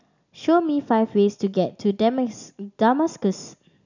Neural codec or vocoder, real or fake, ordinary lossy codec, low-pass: none; real; none; 7.2 kHz